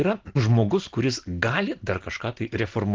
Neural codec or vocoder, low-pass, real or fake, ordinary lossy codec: none; 7.2 kHz; real; Opus, 16 kbps